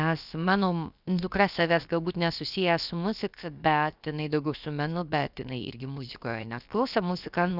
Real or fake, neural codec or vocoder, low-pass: fake; codec, 16 kHz, about 1 kbps, DyCAST, with the encoder's durations; 5.4 kHz